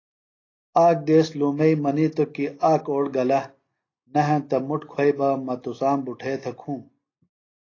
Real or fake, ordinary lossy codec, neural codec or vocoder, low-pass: real; AAC, 32 kbps; none; 7.2 kHz